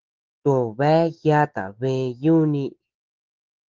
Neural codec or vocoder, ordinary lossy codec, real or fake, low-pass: autoencoder, 48 kHz, 128 numbers a frame, DAC-VAE, trained on Japanese speech; Opus, 16 kbps; fake; 7.2 kHz